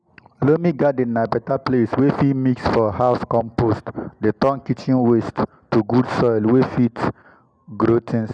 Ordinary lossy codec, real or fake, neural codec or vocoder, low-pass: none; real; none; 9.9 kHz